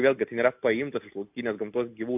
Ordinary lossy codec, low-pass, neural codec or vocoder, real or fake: AAC, 32 kbps; 3.6 kHz; none; real